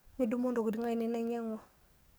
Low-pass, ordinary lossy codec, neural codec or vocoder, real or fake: none; none; codec, 44.1 kHz, 7.8 kbps, DAC; fake